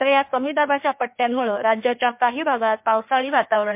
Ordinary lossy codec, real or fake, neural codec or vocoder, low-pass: MP3, 32 kbps; fake; codec, 16 kHz in and 24 kHz out, 1.1 kbps, FireRedTTS-2 codec; 3.6 kHz